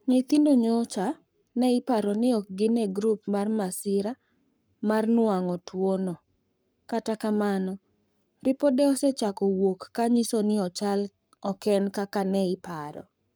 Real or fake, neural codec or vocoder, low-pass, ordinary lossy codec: fake; codec, 44.1 kHz, 7.8 kbps, Pupu-Codec; none; none